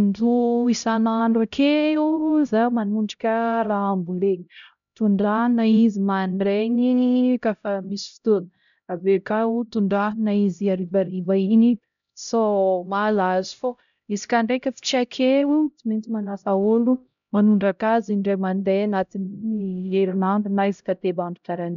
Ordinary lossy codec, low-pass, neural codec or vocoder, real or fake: none; 7.2 kHz; codec, 16 kHz, 0.5 kbps, X-Codec, HuBERT features, trained on LibriSpeech; fake